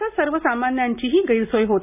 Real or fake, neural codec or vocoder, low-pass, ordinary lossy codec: real; none; 3.6 kHz; none